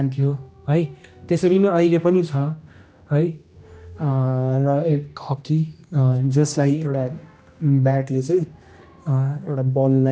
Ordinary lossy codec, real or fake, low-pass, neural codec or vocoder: none; fake; none; codec, 16 kHz, 1 kbps, X-Codec, HuBERT features, trained on general audio